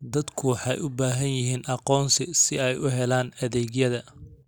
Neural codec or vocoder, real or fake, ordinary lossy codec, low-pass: none; real; none; none